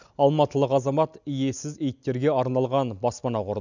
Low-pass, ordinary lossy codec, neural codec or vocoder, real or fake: 7.2 kHz; none; none; real